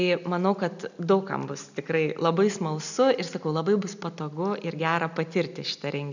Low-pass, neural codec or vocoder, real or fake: 7.2 kHz; none; real